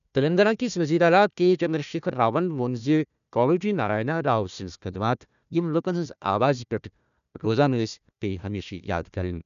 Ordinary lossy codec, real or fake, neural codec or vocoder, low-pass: none; fake; codec, 16 kHz, 1 kbps, FunCodec, trained on Chinese and English, 50 frames a second; 7.2 kHz